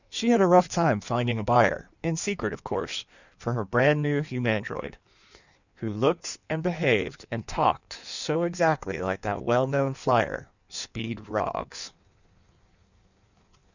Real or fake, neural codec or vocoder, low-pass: fake; codec, 16 kHz in and 24 kHz out, 1.1 kbps, FireRedTTS-2 codec; 7.2 kHz